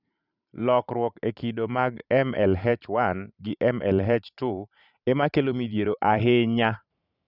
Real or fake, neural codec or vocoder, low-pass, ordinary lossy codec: fake; vocoder, 44.1 kHz, 128 mel bands every 512 samples, BigVGAN v2; 5.4 kHz; none